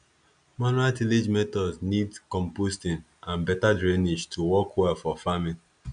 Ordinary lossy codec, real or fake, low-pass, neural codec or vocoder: none; real; 9.9 kHz; none